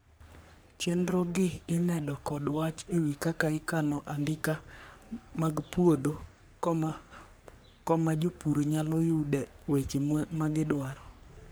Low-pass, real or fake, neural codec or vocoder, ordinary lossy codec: none; fake; codec, 44.1 kHz, 3.4 kbps, Pupu-Codec; none